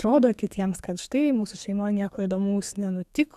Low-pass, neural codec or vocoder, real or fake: 14.4 kHz; codec, 32 kHz, 1.9 kbps, SNAC; fake